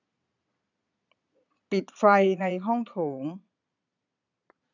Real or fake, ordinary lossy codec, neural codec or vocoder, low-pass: fake; none; vocoder, 22.05 kHz, 80 mel bands, Vocos; 7.2 kHz